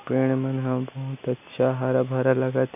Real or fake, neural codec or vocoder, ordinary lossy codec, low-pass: real; none; none; 3.6 kHz